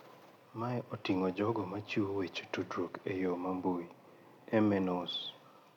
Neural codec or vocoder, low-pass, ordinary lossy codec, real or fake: none; 19.8 kHz; none; real